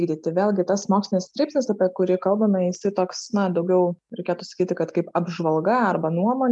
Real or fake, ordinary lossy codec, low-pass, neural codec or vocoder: real; MP3, 96 kbps; 10.8 kHz; none